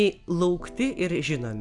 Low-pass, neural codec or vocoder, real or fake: 10.8 kHz; none; real